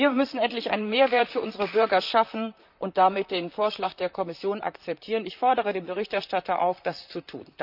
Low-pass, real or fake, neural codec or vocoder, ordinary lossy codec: 5.4 kHz; fake; vocoder, 44.1 kHz, 128 mel bands, Pupu-Vocoder; none